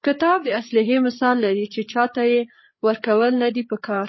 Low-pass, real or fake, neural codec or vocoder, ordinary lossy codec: 7.2 kHz; fake; codec, 16 kHz, 8 kbps, FreqCodec, larger model; MP3, 24 kbps